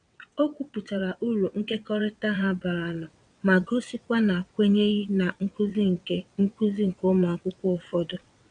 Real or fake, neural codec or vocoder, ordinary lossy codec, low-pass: fake; vocoder, 22.05 kHz, 80 mel bands, WaveNeXt; none; 9.9 kHz